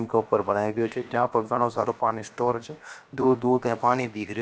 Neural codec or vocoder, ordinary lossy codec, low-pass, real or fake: codec, 16 kHz, about 1 kbps, DyCAST, with the encoder's durations; none; none; fake